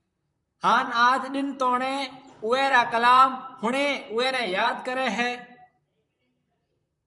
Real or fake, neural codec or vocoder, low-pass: fake; vocoder, 44.1 kHz, 128 mel bands, Pupu-Vocoder; 10.8 kHz